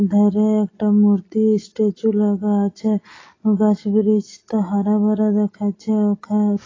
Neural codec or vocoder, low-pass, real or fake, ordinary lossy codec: none; 7.2 kHz; real; AAC, 32 kbps